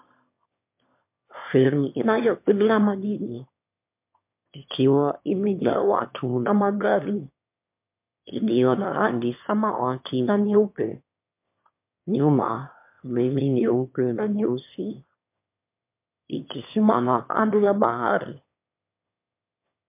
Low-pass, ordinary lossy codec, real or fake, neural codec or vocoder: 3.6 kHz; MP3, 32 kbps; fake; autoencoder, 22.05 kHz, a latent of 192 numbers a frame, VITS, trained on one speaker